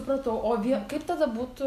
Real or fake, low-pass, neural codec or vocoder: real; 14.4 kHz; none